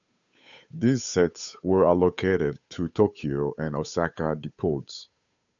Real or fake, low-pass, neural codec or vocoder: fake; 7.2 kHz; codec, 16 kHz, 8 kbps, FunCodec, trained on Chinese and English, 25 frames a second